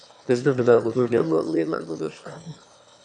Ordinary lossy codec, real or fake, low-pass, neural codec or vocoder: AAC, 64 kbps; fake; 9.9 kHz; autoencoder, 22.05 kHz, a latent of 192 numbers a frame, VITS, trained on one speaker